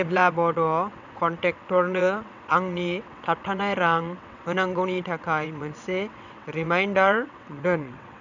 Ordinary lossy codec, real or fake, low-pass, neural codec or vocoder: none; fake; 7.2 kHz; vocoder, 22.05 kHz, 80 mel bands, WaveNeXt